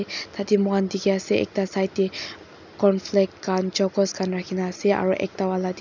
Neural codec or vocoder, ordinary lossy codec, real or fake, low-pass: none; none; real; 7.2 kHz